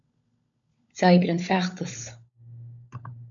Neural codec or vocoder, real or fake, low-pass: codec, 16 kHz, 16 kbps, FunCodec, trained on LibriTTS, 50 frames a second; fake; 7.2 kHz